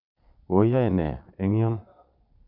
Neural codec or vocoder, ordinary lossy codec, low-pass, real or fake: vocoder, 22.05 kHz, 80 mel bands, Vocos; none; 5.4 kHz; fake